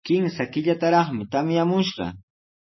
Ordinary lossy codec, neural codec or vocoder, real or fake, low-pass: MP3, 24 kbps; none; real; 7.2 kHz